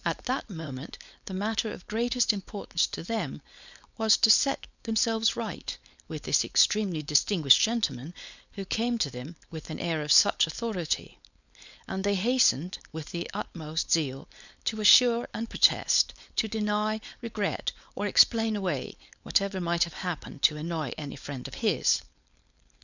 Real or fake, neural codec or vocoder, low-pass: fake; codec, 16 kHz, 4.8 kbps, FACodec; 7.2 kHz